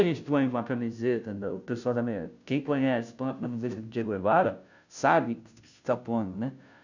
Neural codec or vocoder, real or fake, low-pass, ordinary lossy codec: codec, 16 kHz, 0.5 kbps, FunCodec, trained on Chinese and English, 25 frames a second; fake; 7.2 kHz; none